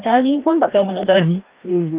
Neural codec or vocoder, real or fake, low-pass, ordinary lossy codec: codec, 16 kHz, 1 kbps, FreqCodec, larger model; fake; 3.6 kHz; Opus, 32 kbps